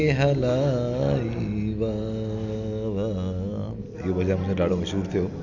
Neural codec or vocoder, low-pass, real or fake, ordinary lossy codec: none; 7.2 kHz; real; none